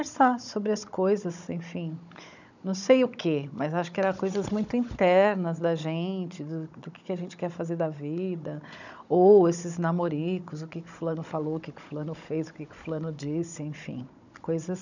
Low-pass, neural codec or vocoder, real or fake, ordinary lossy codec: 7.2 kHz; codec, 16 kHz, 16 kbps, FunCodec, trained on Chinese and English, 50 frames a second; fake; none